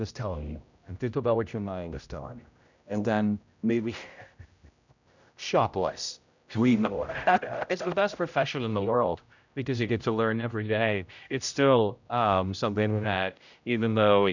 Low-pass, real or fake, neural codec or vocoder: 7.2 kHz; fake; codec, 16 kHz, 0.5 kbps, X-Codec, HuBERT features, trained on general audio